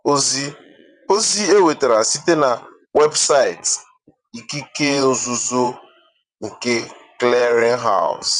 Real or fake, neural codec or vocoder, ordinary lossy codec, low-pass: fake; vocoder, 22.05 kHz, 80 mel bands, WaveNeXt; none; 9.9 kHz